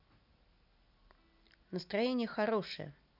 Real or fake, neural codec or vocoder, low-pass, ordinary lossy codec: real; none; 5.4 kHz; none